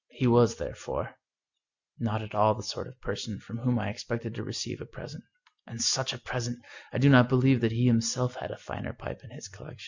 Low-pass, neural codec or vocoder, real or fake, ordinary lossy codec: 7.2 kHz; none; real; Opus, 64 kbps